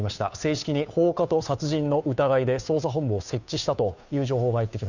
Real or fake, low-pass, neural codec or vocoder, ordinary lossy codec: fake; 7.2 kHz; codec, 16 kHz, 2 kbps, FunCodec, trained on Chinese and English, 25 frames a second; none